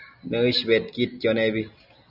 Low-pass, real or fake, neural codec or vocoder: 5.4 kHz; real; none